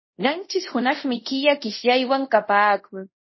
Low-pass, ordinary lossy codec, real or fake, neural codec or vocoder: 7.2 kHz; MP3, 24 kbps; fake; codec, 24 kHz, 0.5 kbps, DualCodec